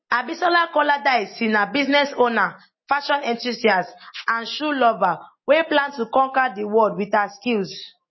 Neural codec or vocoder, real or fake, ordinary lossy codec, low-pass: none; real; MP3, 24 kbps; 7.2 kHz